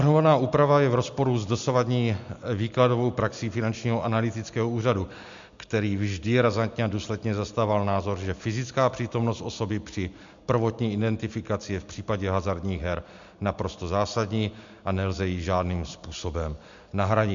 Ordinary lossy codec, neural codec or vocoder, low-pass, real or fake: MP3, 64 kbps; none; 7.2 kHz; real